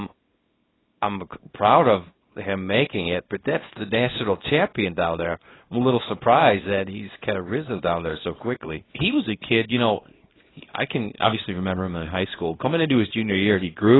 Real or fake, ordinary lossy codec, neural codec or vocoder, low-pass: fake; AAC, 16 kbps; codec, 24 kHz, 0.9 kbps, WavTokenizer, small release; 7.2 kHz